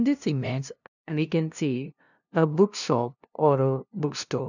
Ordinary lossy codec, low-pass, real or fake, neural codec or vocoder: none; 7.2 kHz; fake; codec, 16 kHz, 0.5 kbps, FunCodec, trained on LibriTTS, 25 frames a second